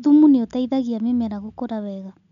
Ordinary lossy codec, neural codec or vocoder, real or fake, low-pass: none; none; real; 7.2 kHz